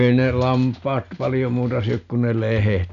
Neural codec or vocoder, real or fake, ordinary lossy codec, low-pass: none; real; none; 7.2 kHz